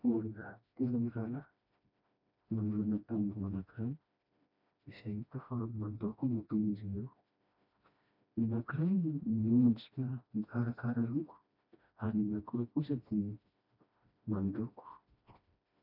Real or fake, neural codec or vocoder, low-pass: fake; codec, 16 kHz, 1 kbps, FreqCodec, smaller model; 5.4 kHz